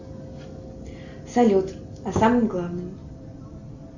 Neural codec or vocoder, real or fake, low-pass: none; real; 7.2 kHz